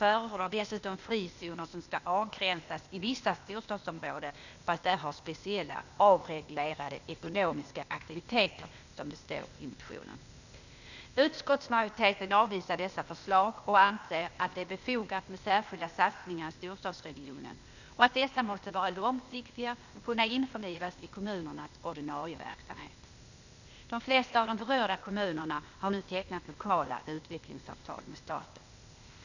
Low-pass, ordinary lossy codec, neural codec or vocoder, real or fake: 7.2 kHz; none; codec, 16 kHz, 0.8 kbps, ZipCodec; fake